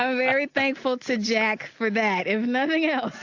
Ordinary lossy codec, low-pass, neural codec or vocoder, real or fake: AAC, 48 kbps; 7.2 kHz; none; real